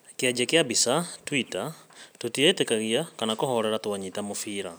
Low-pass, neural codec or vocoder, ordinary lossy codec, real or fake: none; none; none; real